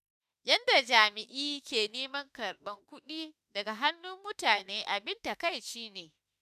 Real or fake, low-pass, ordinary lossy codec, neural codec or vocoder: fake; 14.4 kHz; none; autoencoder, 48 kHz, 32 numbers a frame, DAC-VAE, trained on Japanese speech